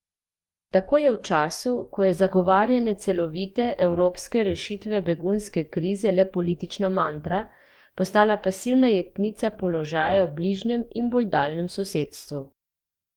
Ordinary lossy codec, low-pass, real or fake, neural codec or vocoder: Opus, 32 kbps; 19.8 kHz; fake; codec, 44.1 kHz, 2.6 kbps, DAC